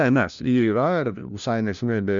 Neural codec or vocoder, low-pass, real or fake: codec, 16 kHz, 1 kbps, FunCodec, trained on LibriTTS, 50 frames a second; 7.2 kHz; fake